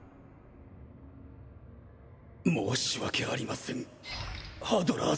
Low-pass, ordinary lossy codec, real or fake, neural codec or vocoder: none; none; real; none